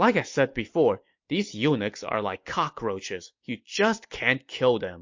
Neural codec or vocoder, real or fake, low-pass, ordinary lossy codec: none; real; 7.2 kHz; MP3, 48 kbps